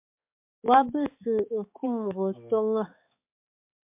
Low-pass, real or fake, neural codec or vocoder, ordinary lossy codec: 3.6 kHz; fake; codec, 16 kHz, 4 kbps, X-Codec, HuBERT features, trained on balanced general audio; MP3, 32 kbps